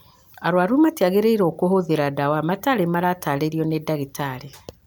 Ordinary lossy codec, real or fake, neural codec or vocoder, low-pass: none; real; none; none